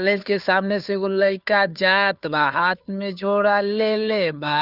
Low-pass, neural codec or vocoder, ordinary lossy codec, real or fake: 5.4 kHz; codec, 16 kHz, 2 kbps, FunCodec, trained on Chinese and English, 25 frames a second; none; fake